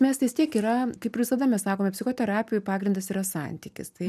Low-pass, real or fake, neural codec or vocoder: 14.4 kHz; real; none